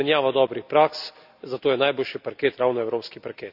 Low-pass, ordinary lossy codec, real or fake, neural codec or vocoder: 5.4 kHz; none; real; none